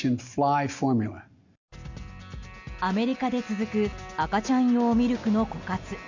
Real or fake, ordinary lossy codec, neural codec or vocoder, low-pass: real; Opus, 64 kbps; none; 7.2 kHz